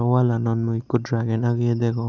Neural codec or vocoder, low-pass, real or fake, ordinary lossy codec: none; 7.2 kHz; real; none